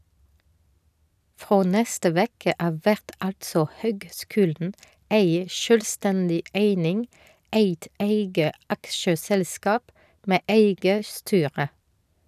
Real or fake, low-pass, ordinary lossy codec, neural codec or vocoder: real; 14.4 kHz; none; none